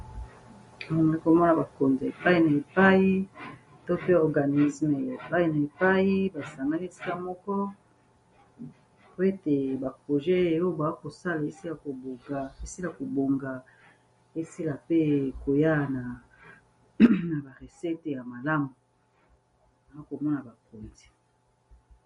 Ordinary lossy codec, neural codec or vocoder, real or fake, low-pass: MP3, 48 kbps; none; real; 10.8 kHz